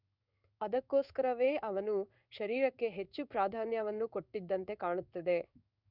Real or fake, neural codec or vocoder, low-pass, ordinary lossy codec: fake; codec, 16 kHz in and 24 kHz out, 1 kbps, XY-Tokenizer; 5.4 kHz; none